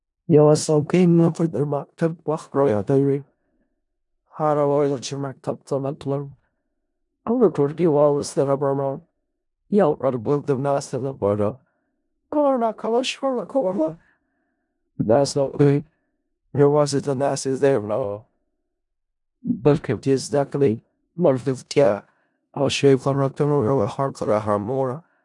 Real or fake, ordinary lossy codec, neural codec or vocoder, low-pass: fake; none; codec, 16 kHz in and 24 kHz out, 0.4 kbps, LongCat-Audio-Codec, four codebook decoder; 10.8 kHz